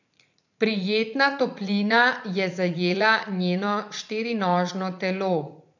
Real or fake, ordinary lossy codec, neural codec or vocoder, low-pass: fake; none; vocoder, 44.1 kHz, 80 mel bands, Vocos; 7.2 kHz